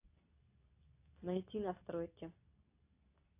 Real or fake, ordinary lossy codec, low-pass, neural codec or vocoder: fake; AAC, 32 kbps; 3.6 kHz; codec, 24 kHz, 0.9 kbps, WavTokenizer, medium speech release version 2